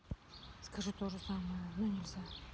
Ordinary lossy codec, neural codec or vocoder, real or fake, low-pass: none; none; real; none